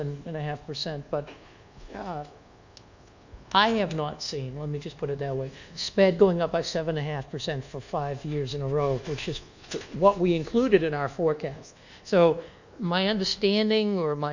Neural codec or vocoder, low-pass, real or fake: codec, 24 kHz, 1.2 kbps, DualCodec; 7.2 kHz; fake